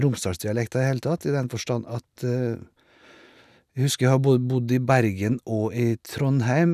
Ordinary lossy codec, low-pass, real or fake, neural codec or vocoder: none; 14.4 kHz; real; none